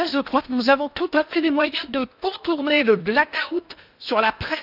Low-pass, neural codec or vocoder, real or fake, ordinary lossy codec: 5.4 kHz; codec, 16 kHz in and 24 kHz out, 0.8 kbps, FocalCodec, streaming, 65536 codes; fake; none